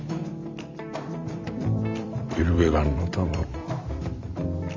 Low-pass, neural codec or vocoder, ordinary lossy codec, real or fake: 7.2 kHz; vocoder, 44.1 kHz, 128 mel bands every 256 samples, BigVGAN v2; MP3, 48 kbps; fake